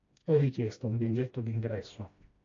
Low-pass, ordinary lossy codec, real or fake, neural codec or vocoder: 7.2 kHz; AAC, 48 kbps; fake; codec, 16 kHz, 1 kbps, FreqCodec, smaller model